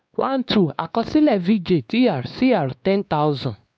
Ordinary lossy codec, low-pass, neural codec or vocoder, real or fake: none; none; codec, 16 kHz, 2 kbps, X-Codec, WavLM features, trained on Multilingual LibriSpeech; fake